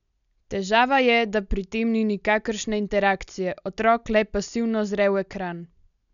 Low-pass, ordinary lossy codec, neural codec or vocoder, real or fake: 7.2 kHz; none; none; real